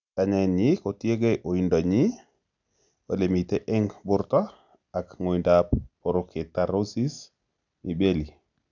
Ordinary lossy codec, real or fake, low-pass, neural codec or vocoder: none; real; 7.2 kHz; none